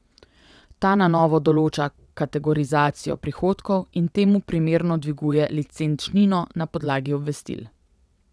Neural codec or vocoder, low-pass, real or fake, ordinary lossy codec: vocoder, 22.05 kHz, 80 mel bands, WaveNeXt; none; fake; none